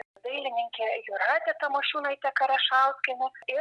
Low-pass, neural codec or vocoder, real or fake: 10.8 kHz; none; real